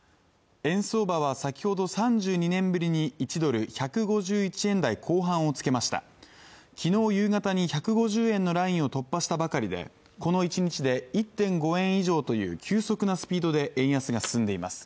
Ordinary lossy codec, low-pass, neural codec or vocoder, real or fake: none; none; none; real